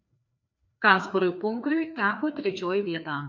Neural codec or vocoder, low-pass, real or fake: codec, 16 kHz, 2 kbps, FreqCodec, larger model; 7.2 kHz; fake